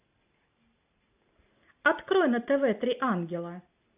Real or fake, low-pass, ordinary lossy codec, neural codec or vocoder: real; 3.6 kHz; AAC, 32 kbps; none